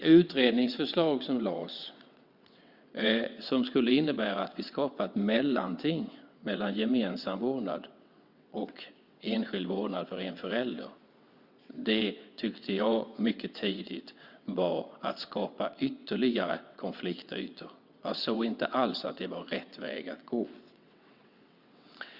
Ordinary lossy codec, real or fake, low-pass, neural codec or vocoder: Opus, 64 kbps; fake; 5.4 kHz; vocoder, 22.05 kHz, 80 mel bands, WaveNeXt